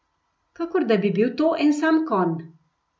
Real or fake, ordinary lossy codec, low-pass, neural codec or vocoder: real; none; none; none